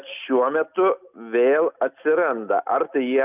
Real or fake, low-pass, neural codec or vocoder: real; 3.6 kHz; none